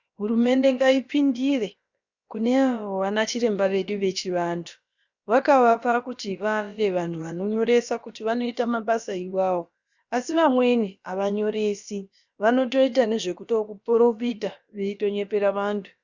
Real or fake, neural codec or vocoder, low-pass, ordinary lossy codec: fake; codec, 16 kHz, about 1 kbps, DyCAST, with the encoder's durations; 7.2 kHz; Opus, 64 kbps